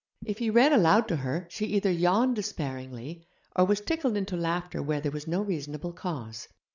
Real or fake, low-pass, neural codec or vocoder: real; 7.2 kHz; none